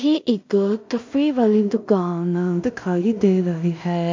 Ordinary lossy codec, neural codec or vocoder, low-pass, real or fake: MP3, 64 kbps; codec, 16 kHz in and 24 kHz out, 0.4 kbps, LongCat-Audio-Codec, two codebook decoder; 7.2 kHz; fake